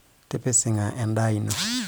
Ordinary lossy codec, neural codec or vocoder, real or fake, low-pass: none; none; real; none